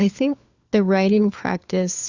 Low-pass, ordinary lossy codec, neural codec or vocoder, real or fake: 7.2 kHz; Opus, 64 kbps; codec, 16 kHz, 4 kbps, FunCodec, trained on LibriTTS, 50 frames a second; fake